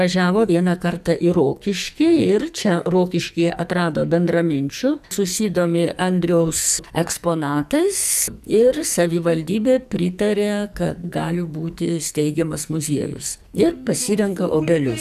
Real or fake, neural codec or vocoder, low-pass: fake; codec, 44.1 kHz, 2.6 kbps, SNAC; 14.4 kHz